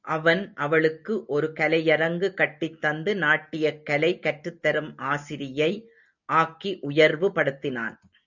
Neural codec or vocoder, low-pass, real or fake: none; 7.2 kHz; real